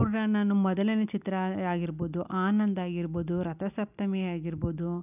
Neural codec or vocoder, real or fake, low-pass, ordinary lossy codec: none; real; 3.6 kHz; none